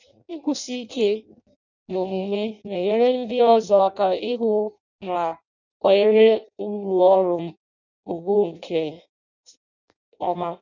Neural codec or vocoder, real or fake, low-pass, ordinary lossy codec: codec, 16 kHz in and 24 kHz out, 0.6 kbps, FireRedTTS-2 codec; fake; 7.2 kHz; none